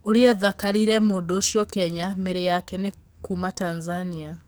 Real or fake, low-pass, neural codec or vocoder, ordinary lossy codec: fake; none; codec, 44.1 kHz, 2.6 kbps, SNAC; none